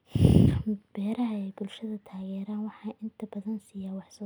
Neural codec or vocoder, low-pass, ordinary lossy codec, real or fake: none; none; none; real